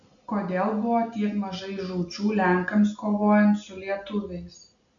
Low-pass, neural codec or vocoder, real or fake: 7.2 kHz; none; real